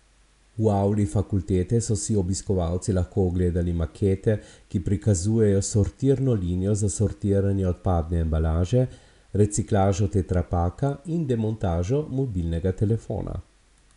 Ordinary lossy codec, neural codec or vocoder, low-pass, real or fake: none; none; 10.8 kHz; real